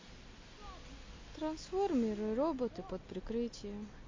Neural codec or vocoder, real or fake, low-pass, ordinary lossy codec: none; real; 7.2 kHz; MP3, 32 kbps